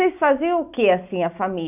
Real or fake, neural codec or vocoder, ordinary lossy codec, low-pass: real; none; none; 3.6 kHz